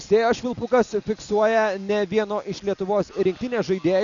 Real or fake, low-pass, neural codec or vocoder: real; 7.2 kHz; none